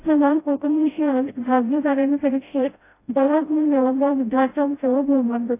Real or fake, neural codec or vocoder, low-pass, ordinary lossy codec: fake; codec, 16 kHz, 0.5 kbps, FreqCodec, smaller model; 3.6 kHz; AAC, 24 kbps